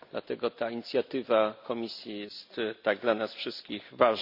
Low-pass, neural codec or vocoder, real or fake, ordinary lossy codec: 5.4 kHz; none; real; none